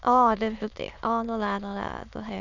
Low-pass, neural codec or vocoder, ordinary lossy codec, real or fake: 7.2 kHz; autoencoder, 22.05 kHz, a latent of 192 numbers a frame, VITS, trained on many speakers; none; fake